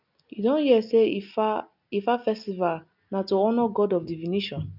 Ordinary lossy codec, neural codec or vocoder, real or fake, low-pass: none; none; real; 5.4 kHz